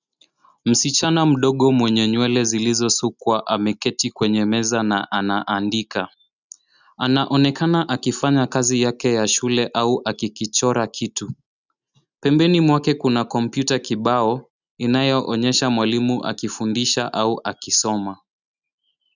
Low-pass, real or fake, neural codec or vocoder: 7.2 kHz; real; none